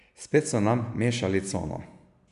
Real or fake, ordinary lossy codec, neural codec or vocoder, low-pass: real; none; none; 10.8 kHz